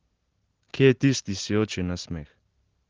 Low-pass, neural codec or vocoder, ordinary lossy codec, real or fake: 7.2 kHz; none; Opus, 16 kbps; real